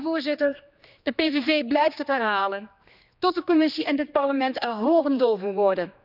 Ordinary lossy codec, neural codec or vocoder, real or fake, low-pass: none; codec, 16 kHz, 2 kbps, X-Codec, HuBERT features, trained on general audio; fake; 5.4 kHz